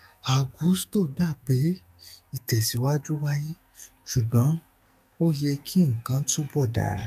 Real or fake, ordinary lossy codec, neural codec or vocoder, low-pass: fake; none; codec, 44.1 kHz, 2.6 kbps, SNAC; 14.4 kHz